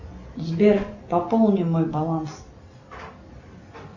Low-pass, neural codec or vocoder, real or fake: 7.2 kHz; none; real